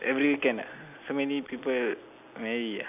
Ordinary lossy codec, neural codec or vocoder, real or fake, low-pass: none; none; real; 3.6 kHz